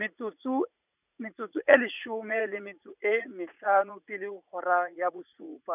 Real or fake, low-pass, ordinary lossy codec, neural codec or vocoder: fake; 3.6 kHz; none; vocoder, 44.1 kHz, 80 mel bands, Vocos